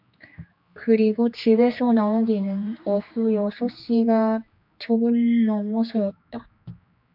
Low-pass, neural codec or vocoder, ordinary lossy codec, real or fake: 5.4 kHz; codec, 16 kHz, 2 kbps, X-Codec, HuBERT features, trained on general audio; MP3, 48 kbps; fake